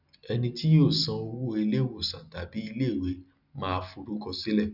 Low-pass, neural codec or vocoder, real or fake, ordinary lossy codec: 5.4 kHz; none; real; none